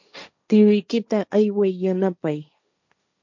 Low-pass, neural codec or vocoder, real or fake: 7.2 kHz; codec, 16 kHz, 1.1 kbps, Voila-Tokenizer; fake